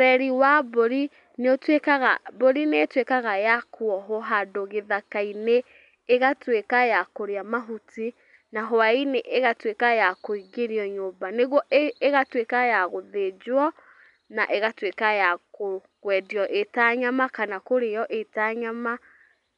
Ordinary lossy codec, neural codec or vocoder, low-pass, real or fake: none; none; 10.8 kHz; real